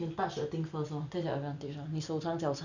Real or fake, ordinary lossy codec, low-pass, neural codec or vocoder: real; none; 7.2 kHz; none